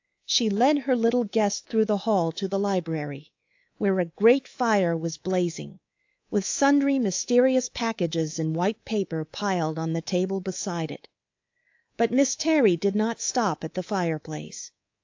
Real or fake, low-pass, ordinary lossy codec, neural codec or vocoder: fake; 7.2 kHz; AAC, 48 kbps; codec, 24 kHz, 3.1 kbps, DualCodec